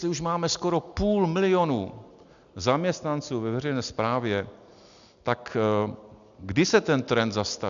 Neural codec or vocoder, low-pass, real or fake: none; 7.2 kHz; real